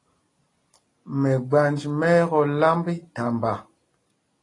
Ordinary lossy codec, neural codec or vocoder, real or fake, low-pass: AAC, 32 kbps; none; real; 10.8 kHz